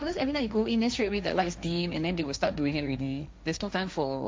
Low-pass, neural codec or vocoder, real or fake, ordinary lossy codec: none; codec, 16 kHz, 1.1 kbps, Voila-Tokenizer; fake; none